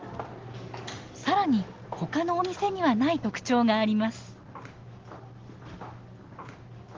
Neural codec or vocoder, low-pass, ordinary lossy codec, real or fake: none; 7.2 kHz; Opus, 16 kbps; real